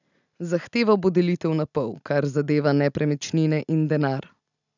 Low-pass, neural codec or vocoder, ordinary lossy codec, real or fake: 7.2 kHz; none; none; real